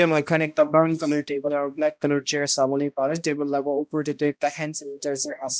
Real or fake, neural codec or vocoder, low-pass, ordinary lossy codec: fake; codec, 16 kHz, 1 kbps, X-Codec, HuBERT features, trained on balanced general audio; none; none